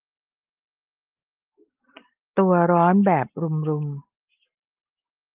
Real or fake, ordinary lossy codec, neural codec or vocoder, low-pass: real; Opus, 24 kbps; none; 3.6 kHz